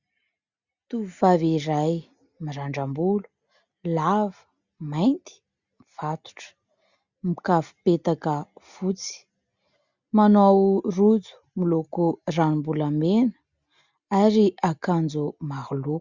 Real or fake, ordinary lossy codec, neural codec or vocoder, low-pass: real; Opus, 64 kbps; none; 7.2 kHz